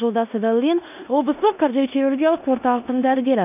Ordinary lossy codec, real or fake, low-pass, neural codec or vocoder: none; fake; 3.6 kHz; codec, 16 kHz in and 24 kHz out, 0.9 kbps, LongCat-Audio-Codec, four codebook decoder